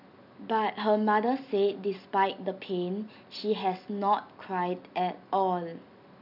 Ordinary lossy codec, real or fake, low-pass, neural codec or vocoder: none; real; 5.4 kHz; none